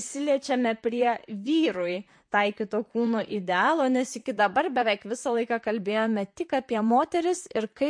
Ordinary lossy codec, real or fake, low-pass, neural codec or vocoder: MP3, 48 kbps; fake; 9.9 kHz; vocoder, 44.1 kHz, 128 mel bands, Pupu-Vocoder